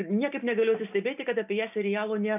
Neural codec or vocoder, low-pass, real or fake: none; 3.6 kHz; real